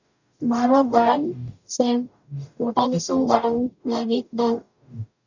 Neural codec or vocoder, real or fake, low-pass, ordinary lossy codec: codec, 44.1 kHz, 0.9 kbps, DAC; fake; 7.2 kHz; none